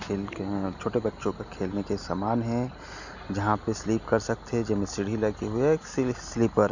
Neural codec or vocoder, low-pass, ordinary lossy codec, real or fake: none; 7.2 kHz; none; real